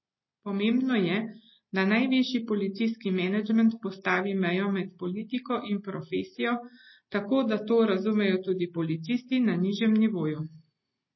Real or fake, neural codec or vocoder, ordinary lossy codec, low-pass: real; none; MP3, 24 kbps; 7.2 kHz